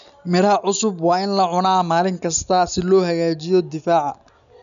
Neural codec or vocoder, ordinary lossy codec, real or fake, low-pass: none; none; real; 7.2 kHz